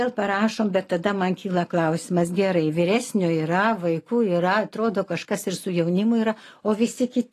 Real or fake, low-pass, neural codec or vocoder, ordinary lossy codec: real; 14.4 kHz; none; AAC, 48 kbps